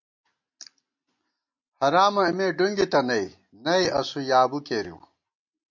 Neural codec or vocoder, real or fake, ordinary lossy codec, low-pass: none; real; MP3, 48 kbps; 7.2 kHz